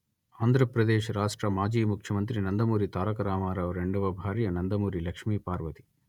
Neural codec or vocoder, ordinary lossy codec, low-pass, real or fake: vocoder, 48 kHz, 128 mel bands, Vocos; none; 19.8 kHz; fake